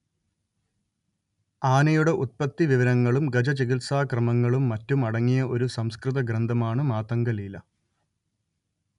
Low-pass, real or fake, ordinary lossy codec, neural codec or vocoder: 10.8 kHz; real; none; none